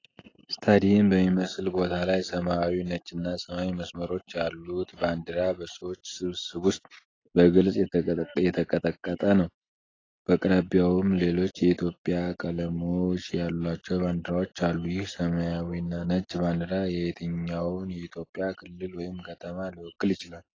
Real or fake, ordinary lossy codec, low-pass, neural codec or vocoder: real; AAC, 32 kbps; 7.2 kHz; none